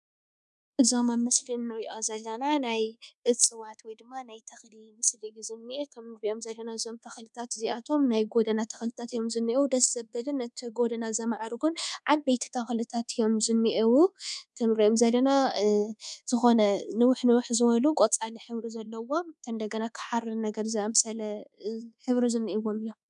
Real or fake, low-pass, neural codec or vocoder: fake; 10.8 kHz; codec, 24 kHz, 1.2 kbps, DualCodec